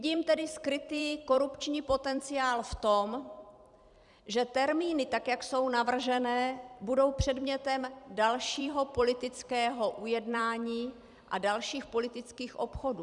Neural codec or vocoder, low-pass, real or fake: vocoder, 44.1 kHz, 128 mel bands every 256 samples, BigVGAN v2; 10.8 kHz; fake